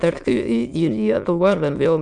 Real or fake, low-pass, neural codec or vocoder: fake; 9.9 kHz; autoencoder, 22.05 kHz, a latent of 192 numbers a frame, VITS, trained on many speakers